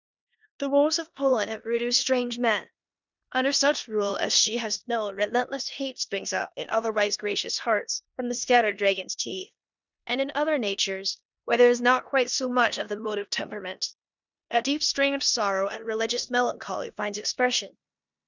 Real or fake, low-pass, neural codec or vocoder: fake; 7.2 kHz; codec, 16 kHz in and 24 kHz out, 0.9 kbps, LongCat-Audio-Codec, four codebook decoder